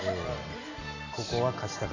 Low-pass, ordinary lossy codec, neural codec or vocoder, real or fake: 7.2 kHz; AAC, 48 kbps; none; real